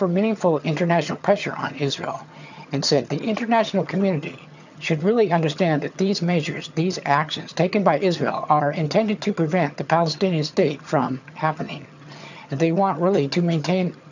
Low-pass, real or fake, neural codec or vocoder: 7.2 kHz; fake; vocoder, 22.05 kHz, 80 mel bands, HiFi-GAN